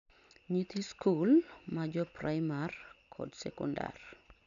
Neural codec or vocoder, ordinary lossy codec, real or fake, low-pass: none; none; real; 7.2 kHz